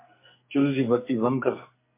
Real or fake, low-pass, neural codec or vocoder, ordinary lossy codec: fake; 3.6 kHz; codec, 44.1 kHz, 2.6 kbps, SNAC; MP3, 24 kbps